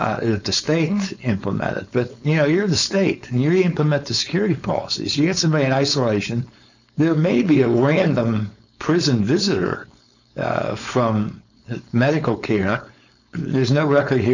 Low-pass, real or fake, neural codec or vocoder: 7.2 kHz; fake; codec, 16 kHz, 4.8 kbps, FACodec